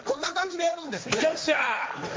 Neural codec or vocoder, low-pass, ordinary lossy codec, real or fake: codec, 16 kHz, 1.1 kbps, Voila-Tokenizer; none; none; fake